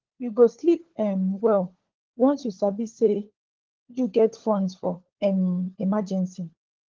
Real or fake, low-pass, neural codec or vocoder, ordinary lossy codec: fake; 7.2 kHz; codec, 16 kHz, 4 kbps, FunCodec, trained on LibriTTS, 50 frames a second; Opus, 16 kbps